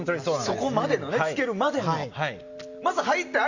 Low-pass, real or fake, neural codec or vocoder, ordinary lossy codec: 7.2 kHz; real; none; Opus, 64 kbps